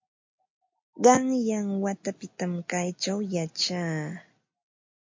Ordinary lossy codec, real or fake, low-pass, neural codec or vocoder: MP3, 48 kbps; real; 7.2 kHz; none